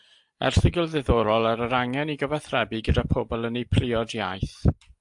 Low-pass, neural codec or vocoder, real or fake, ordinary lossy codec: 10.8 kHz; none; real; AAC, 64 kbps